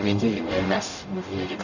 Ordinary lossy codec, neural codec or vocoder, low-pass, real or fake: none; codec, 44.1 kHz, 0.9 kbps, DAC; 7.2 kHz; fake